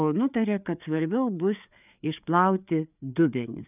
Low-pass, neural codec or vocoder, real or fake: 3.6 kHz; codec, 16 kHz, 4 kbps, FreqCodec, larger model; fake